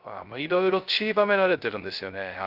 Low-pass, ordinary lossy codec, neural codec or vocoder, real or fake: 5.4 kHz; Opus, 32 kbps; codec, 16 kHz, 0.3 kbps, FocalCodec; fake